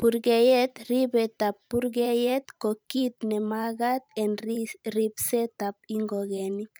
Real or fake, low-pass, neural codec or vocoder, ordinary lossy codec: fake; none; vocoder, 44.1 kHz, 128 mel bands every 512 samples, BigVGAN v2; none